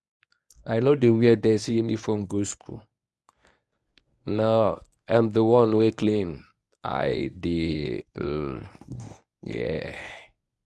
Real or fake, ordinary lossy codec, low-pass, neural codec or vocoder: fake; none; none; codec, 24 kHz, 0.9 kbps, WavTokenizer, medium speech release version 1